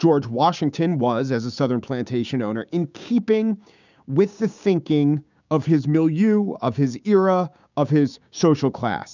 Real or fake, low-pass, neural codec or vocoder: fake; 7.2 kHz; codec, 16 kHz, 6 kbps, DAC